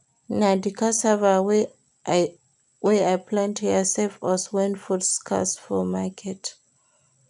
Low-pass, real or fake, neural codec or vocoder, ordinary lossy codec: 10.8 kHz; real; none; none